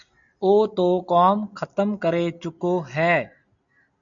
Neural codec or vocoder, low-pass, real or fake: none; 7.2 kHz; real